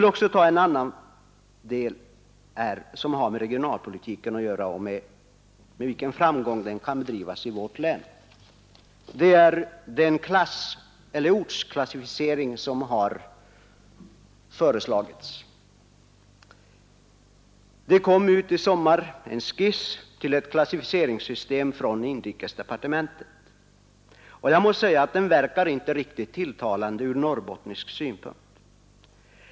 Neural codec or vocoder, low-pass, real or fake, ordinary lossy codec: none; none; real; none